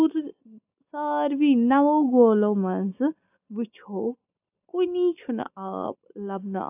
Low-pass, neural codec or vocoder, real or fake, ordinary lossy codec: 3.6 kHz; none; real; AAC, 32 kbps